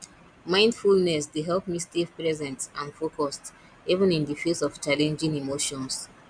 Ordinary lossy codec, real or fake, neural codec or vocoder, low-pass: none; real; none; 9.9 kHz